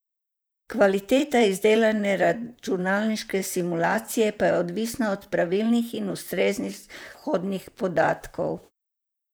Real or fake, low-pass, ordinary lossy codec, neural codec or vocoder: fake; none; none; vocoder, 44.1 kHz, 128 mel bands, Pupu-Vocoder